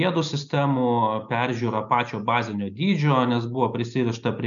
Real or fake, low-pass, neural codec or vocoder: real; 7.2 kHz; none